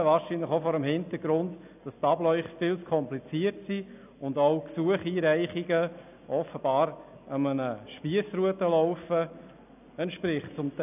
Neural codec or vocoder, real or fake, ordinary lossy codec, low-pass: none; real; none; 3.6 kHz